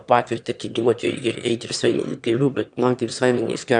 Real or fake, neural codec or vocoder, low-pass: fake; autoencoder, 22.05 kHz, a latent of 192 numbers a frame, VITS, trained on one speaker; 9.9 kHz